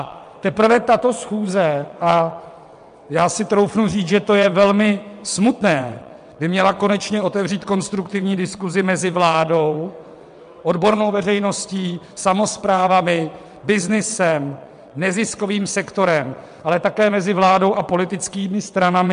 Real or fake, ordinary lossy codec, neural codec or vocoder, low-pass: fake; MP3, 64 kbps; vocoder, 22.05 kHz, 80 mel bands, WaveNeXt; 9.9 kHz